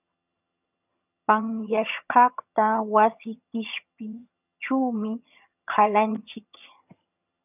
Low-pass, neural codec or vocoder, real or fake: 3.6 kHz; vocoder, 22.05 kHz, 80 mel bands, HiFi-GAN; fake